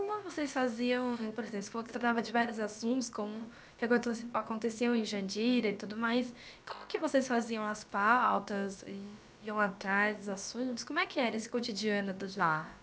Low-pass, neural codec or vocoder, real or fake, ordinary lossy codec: none; codec, 16 kHz, about 1 kbps, DyCAST, with the encoder's durations; fake; none